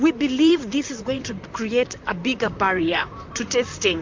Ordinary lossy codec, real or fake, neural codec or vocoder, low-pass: MP3, 64 kbps; fake; vocoder, 44.1 kHz, 128 mel bands, Pupu-Vocoder; 7.2 kHz